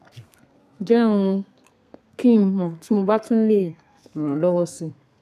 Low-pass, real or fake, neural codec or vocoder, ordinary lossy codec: 14.4 kHz; fake; codec, 32 kHz, 1.9 kbps, SNAC; none